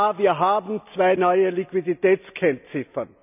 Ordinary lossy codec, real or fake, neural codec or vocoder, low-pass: none; real; none; 3.6 kHz